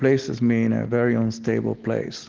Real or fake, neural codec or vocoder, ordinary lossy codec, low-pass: real; none; Opus, 16 kbps; 7.2 kHz